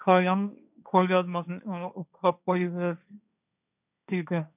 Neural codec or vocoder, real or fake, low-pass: codec, 16 kHz, 1.1 kbps, Voila-Tokenizer; fake; 3.6 kHz